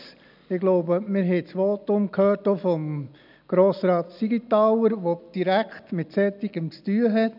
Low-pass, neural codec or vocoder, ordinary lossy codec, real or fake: 5.4 kHz; none; none; real